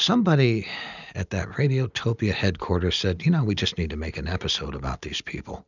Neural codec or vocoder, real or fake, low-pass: none; real; 7.2 kHz